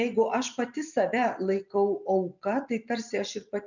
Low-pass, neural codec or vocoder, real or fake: 7.2 kHz; vocoder, 44.1 kHz, 128 mel bands every 256 samples, BigVGAN v2; fake